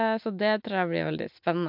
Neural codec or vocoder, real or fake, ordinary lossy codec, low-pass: none; real; MP3, 48 kbps; 5.4 kHz